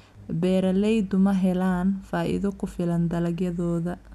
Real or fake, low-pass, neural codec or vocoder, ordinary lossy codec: real; 14.4 kHz; none; none